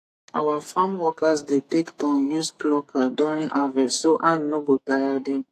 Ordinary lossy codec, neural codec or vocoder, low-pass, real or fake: AAC, 64 kbps; codec, 44.1 kHz, 2.6 kbps, SNAC; 14.4 kHz; fake